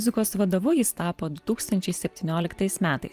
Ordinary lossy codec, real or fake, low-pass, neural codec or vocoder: Opus, 32 kbps; fake; 14.4 kHz; vocoder, 44.1 kHz, 128 mel bands every 512 samples, BigVGAN v2